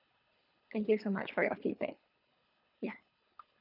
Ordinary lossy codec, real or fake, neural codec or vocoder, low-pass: none; fake; codec, 24 kHz, 3 kbps, HILCodec; 5.4 kHz